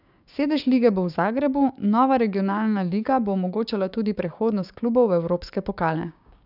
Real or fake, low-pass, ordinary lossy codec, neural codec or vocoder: fake; 5.4 kHz; none; codec, 16 kHz, 6 kbps, DAC